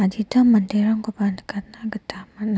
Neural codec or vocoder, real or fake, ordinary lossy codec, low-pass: none; real; none; none